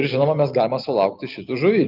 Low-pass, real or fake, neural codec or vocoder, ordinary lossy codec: 5.4 kHz; real; none; Opus, 32 kbps